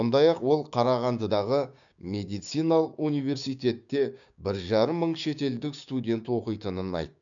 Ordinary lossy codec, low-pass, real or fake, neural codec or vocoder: none; 7.2 kHz; fake; codec, 16 kHz, 6 kbps, DAC